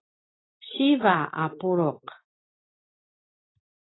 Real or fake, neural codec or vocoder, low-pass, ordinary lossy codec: real; none; 7.2 kHz; AAC, 16 kbps